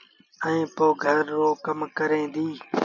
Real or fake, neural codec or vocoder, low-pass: real; none; 7.2 kHz